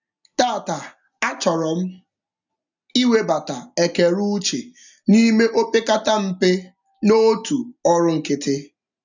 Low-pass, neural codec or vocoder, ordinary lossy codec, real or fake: 7.2 kHz; none; none; real